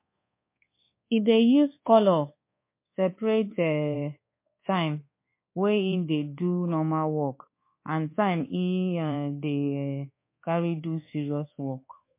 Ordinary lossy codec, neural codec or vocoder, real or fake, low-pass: MP3, 24 kbps; codec, 24 kHz, 1.2 kbps, DualCodec; fake; 3.6 kHz